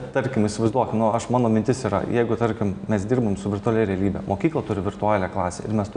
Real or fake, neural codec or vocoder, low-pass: real; none; 9.9 kHz